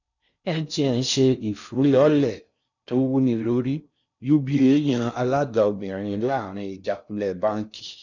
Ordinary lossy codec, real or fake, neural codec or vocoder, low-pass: none; fake; codec, 16 kHz in and 24 kHz out, 0.6 kbps, FocalCodec, streaming, 4096 codes; 7.2 kHz